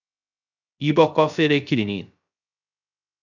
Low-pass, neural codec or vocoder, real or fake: 7.2 kHz; codec, 16 kHz, 0.3 kbps, FocalCodec; fake